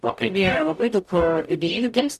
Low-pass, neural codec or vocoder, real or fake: 14.4 kHz; codec, 44.1 kHz, 0.9 kbps, DAC; fake